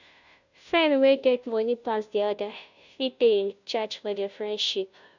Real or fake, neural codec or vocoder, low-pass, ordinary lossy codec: fake; codec, 16 kHz, 0.5 kbps, FunCodec, trained on Chinese and English, 25 frames a second; 7.2 kHz; none